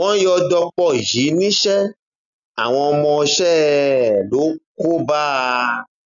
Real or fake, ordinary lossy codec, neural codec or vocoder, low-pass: real; none; none; 7.2 kHz